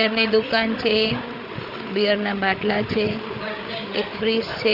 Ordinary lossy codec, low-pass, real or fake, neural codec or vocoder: none; 5.4 kHz; fake; codec, 16 kHz, 8 kbps, FreqCodec, larger model